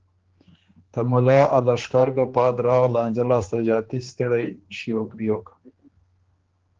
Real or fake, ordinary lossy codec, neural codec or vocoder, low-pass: fake; Opus, 16 kbps; codec, 16 kHz, 2 kbps, X-Codec, HuBERT features, trained on general audio; 7.2 kHz